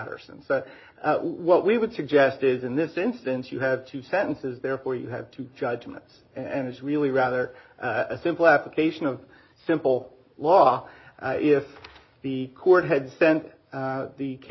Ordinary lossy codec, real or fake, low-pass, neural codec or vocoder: MP3, 24 kbps; real; 7.2 kHz; none